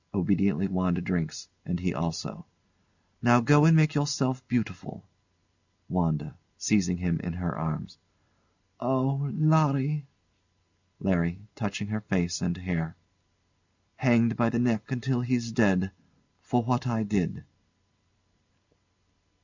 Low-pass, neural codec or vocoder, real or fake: 7.2 kHz; none; real